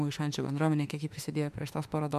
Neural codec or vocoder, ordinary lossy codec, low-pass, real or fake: autoencoder, 48 kHz, 32 numbers a frame, DAC-VAE, trained on Japanese speech; MP3, 96 kbps; 14.4 kHz; fake